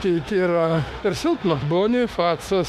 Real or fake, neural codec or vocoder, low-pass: fake; autoencoder, 48 kHz, 32 numbers a frame, DAC-VAE, trained on Japanese speech; 14.4 kHz